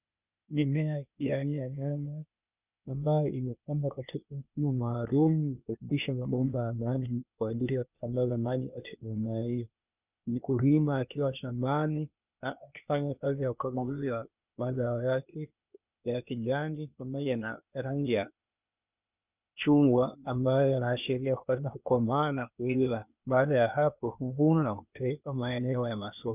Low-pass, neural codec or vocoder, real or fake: 3.6 kHz; codec, 16 kHz, 0.8 kbps, ZipCodec; fake